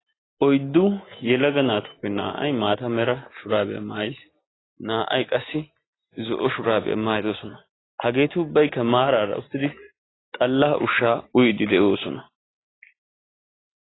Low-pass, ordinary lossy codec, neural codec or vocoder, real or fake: 7.2 kHz; AAC, 16 kbps; none; real